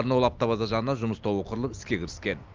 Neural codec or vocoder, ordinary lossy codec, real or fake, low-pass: none; Opus, 24 kbps; real; 7.2 kHz